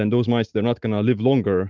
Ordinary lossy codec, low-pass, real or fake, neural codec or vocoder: Opus, 32 kbps; 7.2 kHz; real; none